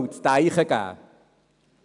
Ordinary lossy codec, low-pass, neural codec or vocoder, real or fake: none; 10.8 kHz; none; real